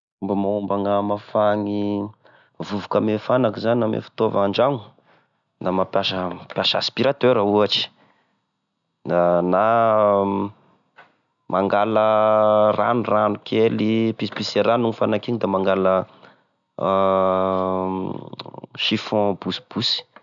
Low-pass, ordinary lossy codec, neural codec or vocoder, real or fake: 7.2 kHz; none; none; real